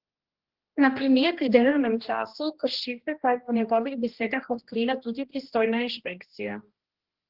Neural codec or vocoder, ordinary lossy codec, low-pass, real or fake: codec, 16 kHz, 1 kbps, X-Codec, HuBERT features, trained on general audio; Opus, 16 kbps; 5.4 kHz; fake